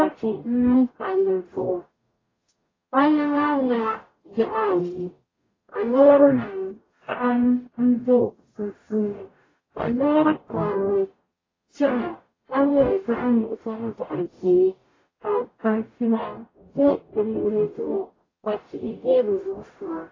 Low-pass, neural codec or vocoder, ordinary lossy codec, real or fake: 7.2 kHz; codec, 44.1 kHz, 0.9 kbps, DAC; AAC, 32 kbps; fake